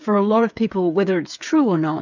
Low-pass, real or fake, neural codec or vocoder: 7.2 kHz; fake; codec, 16 kHz, 8 kbps, FreqCodec, smaller model